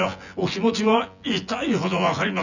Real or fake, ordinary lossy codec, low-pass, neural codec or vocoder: fake; none; 7.2 kHz; vocoder, 24 kHz, 100 mel bands, Vocos